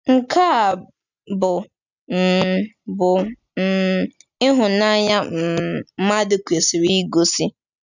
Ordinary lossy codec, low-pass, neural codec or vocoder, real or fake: none; 7.2 kHz; none; real